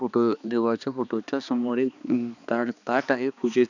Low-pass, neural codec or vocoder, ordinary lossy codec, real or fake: 7.2 kHz; codec, 16 kHz, 2 kbps, X-Codec, HuBERT features, trained on balanced general audio; none; fake